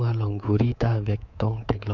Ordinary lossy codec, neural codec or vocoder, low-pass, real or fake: none; codec, 24 kHz, 3.1 kbps, DualCodec; 7.2 kHz; fake